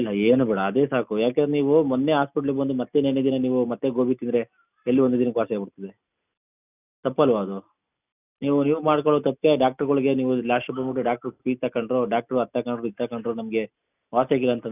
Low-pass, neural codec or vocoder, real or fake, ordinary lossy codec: 3.6 kHz; none; real; none